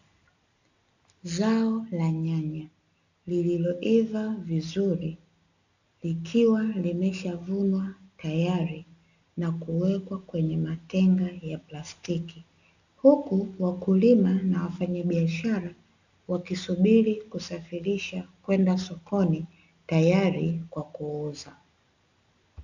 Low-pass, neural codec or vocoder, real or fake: 7.2 kHz; none; real